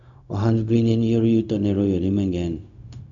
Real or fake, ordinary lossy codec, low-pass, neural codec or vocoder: fake; MP3, 96 kbps; 7.2 kHz; codec, 16 kHz, 0.4 kbps, LongCat-Audio-Codec